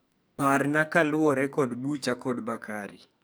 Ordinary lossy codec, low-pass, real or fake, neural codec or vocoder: none; none; fake; codec, 44.1 kHz, 2.6 kbps, SNAC